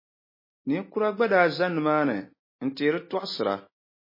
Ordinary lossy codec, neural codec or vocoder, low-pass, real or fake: MP3, 24 kbps; none; 5.4 kHz; real